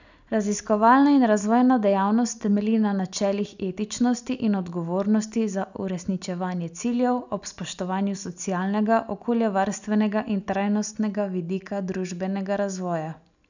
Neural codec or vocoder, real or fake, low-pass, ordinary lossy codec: none; real; 7.2 kHz; none